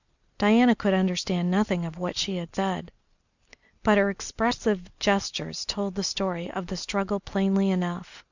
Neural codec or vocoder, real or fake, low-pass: none; real; 7.2 kHz